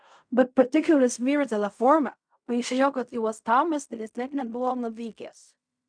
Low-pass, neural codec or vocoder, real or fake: 9.9 kHz; codec, 16 kHz in and 24 kHz out, 0.4 kbps, LongCat-Audio-Codec, fine tuned four codebook decoder; fake